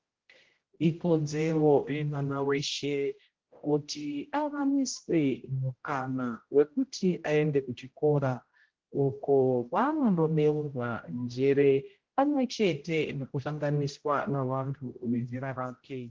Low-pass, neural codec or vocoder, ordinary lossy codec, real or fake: 7.2 kHz; codec, 16 kHz, 0.5 kbps, X-Codec, HuBERT features, trained on general audio; Opus, 16 kbps; fake